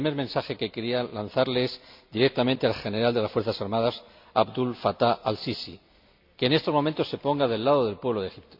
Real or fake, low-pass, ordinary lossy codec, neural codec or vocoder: real; 5.4 kHz; MP3, 48 kbps; none